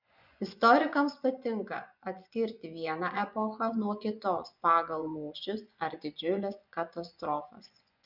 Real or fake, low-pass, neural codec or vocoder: fake; 5.4 kHz; vocoder, 24 kHz, 100 mel bands, Vocos